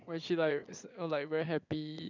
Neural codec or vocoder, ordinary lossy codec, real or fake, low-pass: vocoder, 22.05 kHz, 80 mel bands, Vocos; none; fake; 7.2 kHz